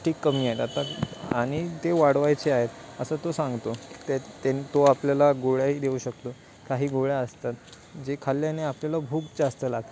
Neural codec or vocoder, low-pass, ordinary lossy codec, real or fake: none; none; none; real